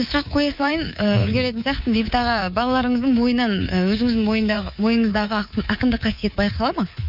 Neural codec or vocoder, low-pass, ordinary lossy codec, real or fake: codec, 24 kHz, 3.1 kbps, DualCodec; 5.4 kHz; none; fake